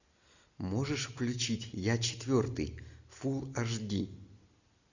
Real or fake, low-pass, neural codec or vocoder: real; 7.2 kHz; none